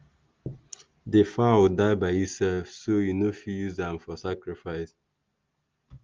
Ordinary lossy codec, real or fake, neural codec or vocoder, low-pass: Opus, 32 kbps; real; none; 7.2 kHz